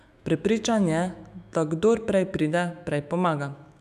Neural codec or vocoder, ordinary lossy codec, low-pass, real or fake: autoencoder, 48 kHz, 128 numbers a frame, DAC-VAE, trained on Japanese speech; none; 14.4 kHz; fake